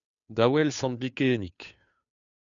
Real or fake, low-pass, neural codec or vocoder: fake; 7.2 kHz; codec, 16 kHz, 2 kbps, FunCodec, trained on Chinese and English, 25 frames a second